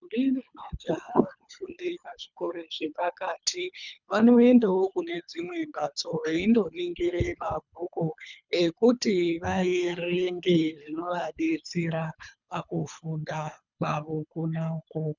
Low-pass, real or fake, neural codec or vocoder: 7.2 kHz; fake; codec, 24 kHz, 3 kbps, HILCodec